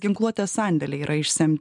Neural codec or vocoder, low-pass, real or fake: none; 10.8 kHz; real